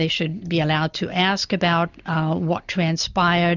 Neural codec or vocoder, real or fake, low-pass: none; real; 7.2 kHz